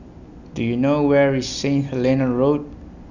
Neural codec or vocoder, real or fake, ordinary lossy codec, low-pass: none; real; none; 7.2 kHz